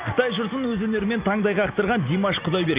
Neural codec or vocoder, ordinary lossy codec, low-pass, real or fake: none; Opus, 64 kbps; 3.6 kHz; real